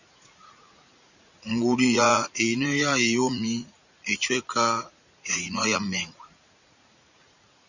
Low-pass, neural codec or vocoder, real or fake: 7.2 kHz; vocoder, 44.1 kHz, 80 mel bands, Vocos; fake